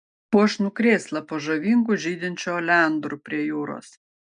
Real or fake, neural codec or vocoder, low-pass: real; none; 9.9 kHz